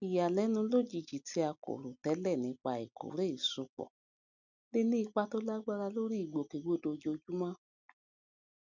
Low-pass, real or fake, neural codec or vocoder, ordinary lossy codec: 7.2 kHz; real; none; none